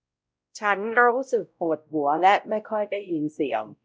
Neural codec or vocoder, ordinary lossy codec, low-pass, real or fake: codec, 16 kHz, 0.5 kbps, X-Codec, WavLM features, trained on Multilingual LibriSpeech; none; none; fake